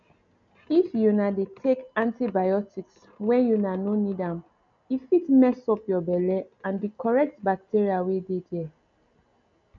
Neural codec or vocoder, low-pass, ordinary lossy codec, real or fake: none; 7.2 kHz; none; real